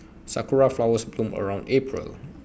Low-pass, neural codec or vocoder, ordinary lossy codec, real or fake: none; none; none; real